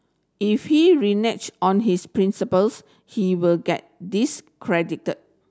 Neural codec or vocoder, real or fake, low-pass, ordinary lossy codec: none; real; none; none